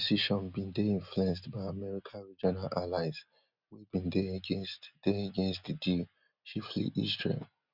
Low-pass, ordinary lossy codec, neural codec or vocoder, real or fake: 5.4 kHz; none; none; real